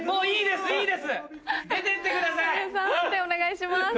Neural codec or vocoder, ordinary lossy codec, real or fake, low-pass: none; none; real; none